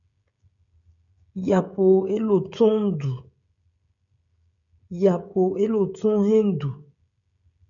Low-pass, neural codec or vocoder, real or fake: 7.2 kHz; codec, 16 kHz, 16 kbps, FreqCodec, smaller model; fake